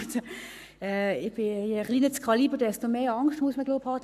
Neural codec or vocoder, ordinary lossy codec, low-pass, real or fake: codec, 44.1 kHz, 7.8 kbps, Pupu-Codec; none; 14.4 kHz; fake